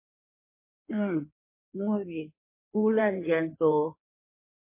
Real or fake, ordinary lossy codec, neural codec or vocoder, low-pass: fake; MP3, 24 kbps; codec, 16 kHz in and 24 kHz out, 1.1 kbps, FireRedTTS-2 codec; 3.6 kHz